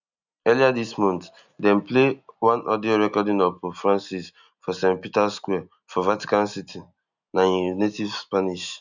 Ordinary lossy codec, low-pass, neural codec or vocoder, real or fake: none; 7.2 kHz; none; real